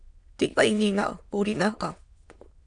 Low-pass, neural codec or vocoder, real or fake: 9.9 kHz; autoencoder, 22.05 kHz, a latent of 192 numbers a frame, VITS, trained on many speakers; fake